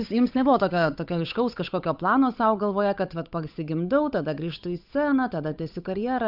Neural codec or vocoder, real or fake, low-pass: codec, 16 kHz, 8 kbps, FunCodec, trained on Chinese and English, 25 frames a second; fake; 5.4 kHz